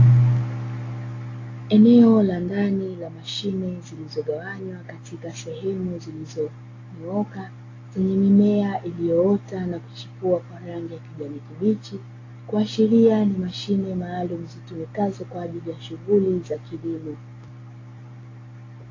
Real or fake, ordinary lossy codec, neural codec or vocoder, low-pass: real; AAC, 32 kbps; none; 7.2 kHz